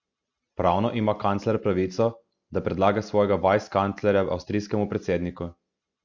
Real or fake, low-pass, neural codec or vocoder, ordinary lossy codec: real; 7.2 kHz; none; Opus, 64 kbps